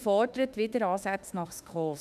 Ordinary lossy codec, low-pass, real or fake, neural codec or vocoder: none; 14.4 kHz; fake; autoencoder, 48 kHz, 32 numbers a frame, DAC-VAE, trained on Japanese speech